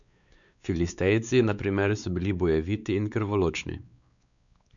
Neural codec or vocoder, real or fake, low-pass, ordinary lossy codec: codec, 16 kHz, 4 kbps, X-Codec, WavLM features, trained on Multilingual LibriSpeech; fake; 7.2 kHz; none